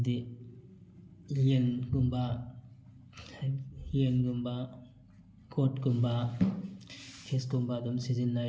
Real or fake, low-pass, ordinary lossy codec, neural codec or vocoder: real; none; none; none